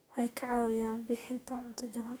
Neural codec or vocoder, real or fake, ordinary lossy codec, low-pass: codec, 44.1 kHz, 2.6 kbps, DAC; fake; none; none